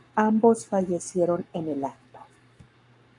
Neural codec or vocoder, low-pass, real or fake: codec, 44.1 kHz, 7.8 kbps, Pupu-Codec; 10.8 kHz; fake